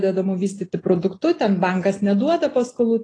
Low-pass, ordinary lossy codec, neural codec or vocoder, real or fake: 9.9 kHz; AAC, 32 kbps; none; real